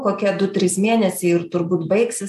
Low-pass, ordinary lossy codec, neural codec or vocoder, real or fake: 14.4 kHz; AAC, 96 kbps; none; real